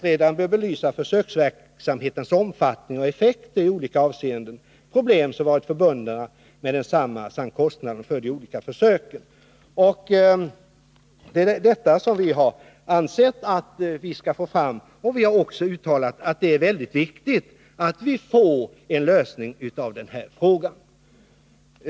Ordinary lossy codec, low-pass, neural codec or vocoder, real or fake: none; none; none; real